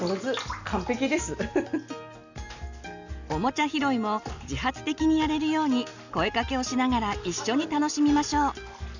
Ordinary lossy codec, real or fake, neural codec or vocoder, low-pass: none; real; none; 7.2 kHz